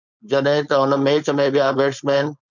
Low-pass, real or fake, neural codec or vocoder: 7.2 kHz; fake; codec, 16 kHz, 4.8 kbps, FACodec